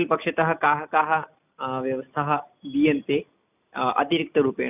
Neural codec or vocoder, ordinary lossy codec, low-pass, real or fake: none; none; 3.6 kHz; real